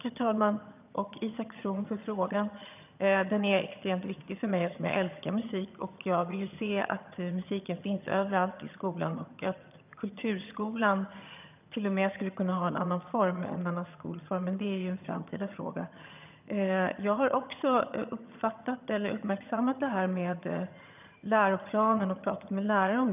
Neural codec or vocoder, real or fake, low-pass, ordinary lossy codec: vocoder, 22.05 kHz, 80 mel bands, HiFi-GAN; fake; 3.6 kHz; none